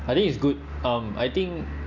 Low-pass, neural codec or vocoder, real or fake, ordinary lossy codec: 7.2 kHz; none; real; none